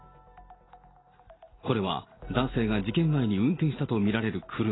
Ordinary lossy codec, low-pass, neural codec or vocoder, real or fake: AAC, 16 kbps; 7.2 kHz; none; real